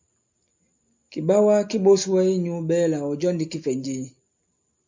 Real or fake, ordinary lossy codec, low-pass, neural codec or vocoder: real; MP3, 64 kbps; 7.2 kHz; none